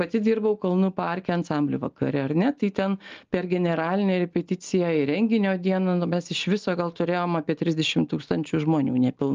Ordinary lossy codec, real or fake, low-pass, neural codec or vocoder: Opus, 24 kbps; real; 7.2 kHz; none